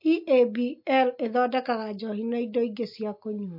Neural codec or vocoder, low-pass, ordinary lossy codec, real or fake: none; 5.4 kHz; MP3, 32 kbps; real